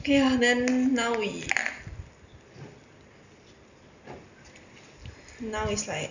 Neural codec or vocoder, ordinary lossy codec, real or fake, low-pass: none; none; real; 7.2 kHz